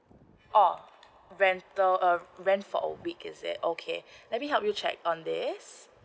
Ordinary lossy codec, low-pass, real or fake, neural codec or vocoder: none; none; real; none